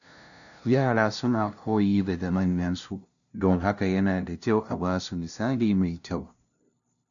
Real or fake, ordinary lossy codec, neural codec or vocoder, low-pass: fake; none; codec, 16 kHz, 0.5 kbps, FunCodec, trained on LibriTTS, 25 frames a second; 7.2 kHz